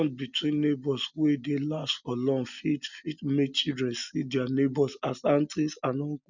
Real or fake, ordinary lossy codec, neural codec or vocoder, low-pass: real; none; none; 7.2 kHz